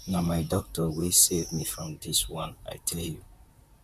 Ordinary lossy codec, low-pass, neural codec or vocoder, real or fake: MP3, 96 kbps; 14.4 kHz; vocoder, 44.1 kHz, 128 mel bands, Pupu-Vocoder; fake